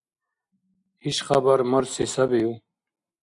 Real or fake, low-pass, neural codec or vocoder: real; 10.8 kHz; none